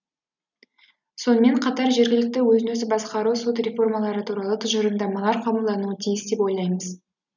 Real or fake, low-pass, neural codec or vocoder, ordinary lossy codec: real; 7.2 kHz; none; none